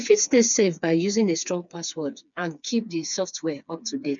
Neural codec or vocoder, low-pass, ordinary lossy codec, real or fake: codec, 16 kHz, 4 kbps, FreqCodec, smaller model; 7.2 kHz; none; fake